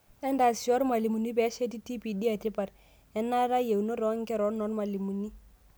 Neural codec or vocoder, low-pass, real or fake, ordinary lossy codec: none; none; real; none